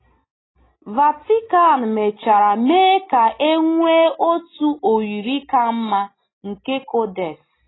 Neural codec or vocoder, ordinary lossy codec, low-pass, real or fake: none; AAC, 16 kbps; 7.2 kHz; real